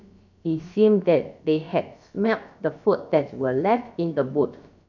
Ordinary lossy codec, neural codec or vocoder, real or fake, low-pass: none; codec, 16 kHz, about 1 kbps, DyCAST, with the encoder's durations; fake; 7.2 kHz